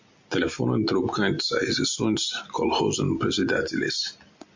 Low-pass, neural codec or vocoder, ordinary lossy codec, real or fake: 7.2 kHz; none; MP3, 48 kbps; real